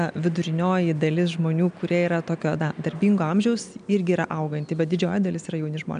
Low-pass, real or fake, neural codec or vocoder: 9.9 kHz; real; none